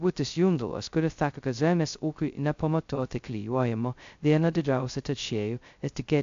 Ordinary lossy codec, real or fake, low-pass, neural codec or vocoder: AAC, 64 kbps; fake; 7.2 kHz; codec, 16 kHz, 0.2 kbps, FocalCodec